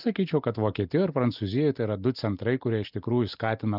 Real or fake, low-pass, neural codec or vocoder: real; 5.4 kHz; none